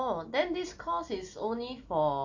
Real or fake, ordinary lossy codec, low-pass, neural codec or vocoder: real; AAC, 48 kbps; 7.2 kHz; none